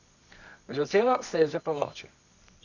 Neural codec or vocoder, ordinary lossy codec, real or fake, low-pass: codec, 24 kHz, 0.9 kbps, WavTokenizer, medium music audio release; none; fake; 7.2 kHz